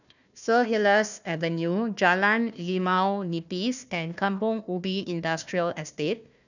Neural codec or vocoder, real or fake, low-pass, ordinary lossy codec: codec, 16 kHz, 1 kbps, FunCodec, trained on Chinese and English, 50 frames a second; fake; 7.2 kHz; none